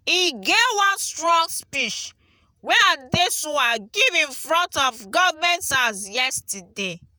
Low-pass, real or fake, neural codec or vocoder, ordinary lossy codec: none; fake; vocoder, 48 kHz, 128 mel bands, Vocos; none